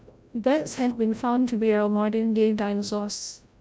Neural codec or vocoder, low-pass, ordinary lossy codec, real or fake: codec, 16 kHz, 0.5 kbps, FreqCodec, larger model; none; none; fake